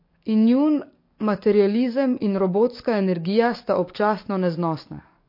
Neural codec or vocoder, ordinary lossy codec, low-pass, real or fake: codec, 44.1 kHz, 7.8 kbps, DAC; MP3, 32 kbps; 5.4 kHz; fake